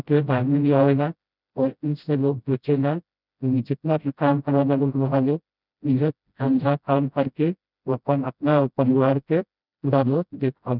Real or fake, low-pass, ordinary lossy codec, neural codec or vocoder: fake; 5.4 kHz; none; codec, 16 kHz, 0.5 kbps, FreqCodec, smaller model